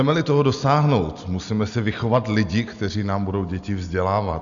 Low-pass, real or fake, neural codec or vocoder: 7.2 kHz; real; none